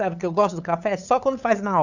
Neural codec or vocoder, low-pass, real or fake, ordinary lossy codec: codec, 16 kHz, 8 kbps, FunCodec, trained on LibriTTS, 25 frames a second; 7.2 kHz; fake; none